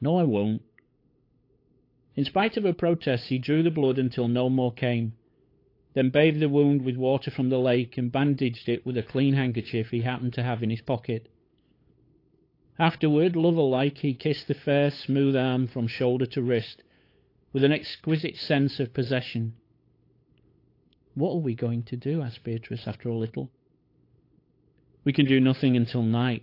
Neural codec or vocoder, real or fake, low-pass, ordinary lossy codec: codec, 16 kHz, 8 kbps, FunCodec, trained on LibriTTS, 25 frames a second; fake; 5.4 kHz; AAC, 32 kbps